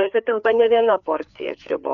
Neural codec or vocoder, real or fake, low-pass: codec, 16 kHz, 4 kbps, FreqCodec, larger model; fake; 7.2 kHz